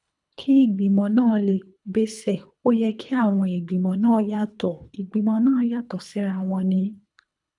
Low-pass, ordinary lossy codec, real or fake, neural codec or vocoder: 10.8 kHz; none; fake; codec, 24 kHz, 3 kbps, HILCodec